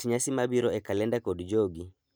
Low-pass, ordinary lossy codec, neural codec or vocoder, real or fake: none; none; none; real